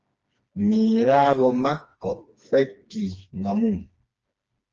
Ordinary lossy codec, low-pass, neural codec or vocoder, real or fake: Opus, 24 kbps; 7.2 kHz; codec, 16 kHz, 2 kbps, FreqCodec, smaller model; fake